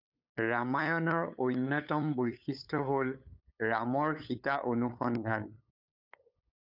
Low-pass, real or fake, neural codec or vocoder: 5.4 kHz; fake; codec, 16 kHz, 8 kbps, FunCodec, trained on LibriTTS, 25 frames a second